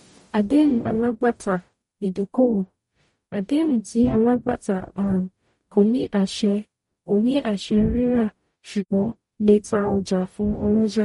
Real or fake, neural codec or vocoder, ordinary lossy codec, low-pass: fake; codec, 44.1 kHz, 0.9 kbps, DAC; MP3, 48 kbps; 19.8 kHz